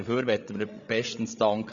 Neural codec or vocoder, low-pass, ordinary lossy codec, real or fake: codec, 16 kHz, 16 kbps, FreqCodec, larger model; 7.2 kHz; Opus, 64 kbps; fake